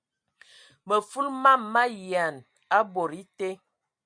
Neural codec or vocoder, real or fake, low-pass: none; real; 9.9 kHz